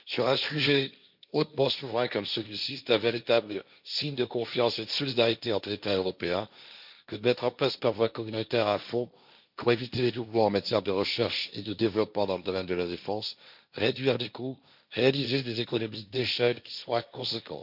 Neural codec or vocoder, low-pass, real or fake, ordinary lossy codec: codec, 16 kHz, 1.1 kbps, Voila-Tokenizer; 5.4 kHz; fake; none